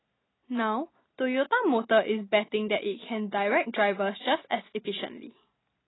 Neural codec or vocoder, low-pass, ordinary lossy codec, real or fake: none; 7.2 kHz; AAC, 16 kbps; real